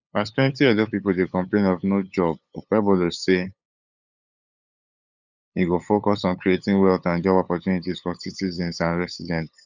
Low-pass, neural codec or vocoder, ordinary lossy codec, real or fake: 7.2 kHz; codec, 16 kHz, 8 kbps, FunCodec, trained on LibriTTS, 25 frames a second; none; fake